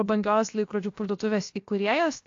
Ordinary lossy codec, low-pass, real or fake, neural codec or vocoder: AAC, 48 kbps; 7.2 kHz; fake; codec, 16 kHz, 0.7 kbps, FocalCodec